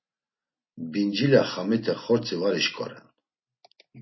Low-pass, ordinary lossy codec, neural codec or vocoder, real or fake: 7.2 kHz; MP3, 24 kbps; none; real